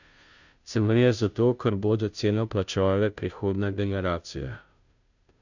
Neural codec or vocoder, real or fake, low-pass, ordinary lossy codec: codec, 16 kHz, 0.5 kbps, FunCodec, trained on Chinese and English, 25 frames a second; fake; 7.2 kHz; none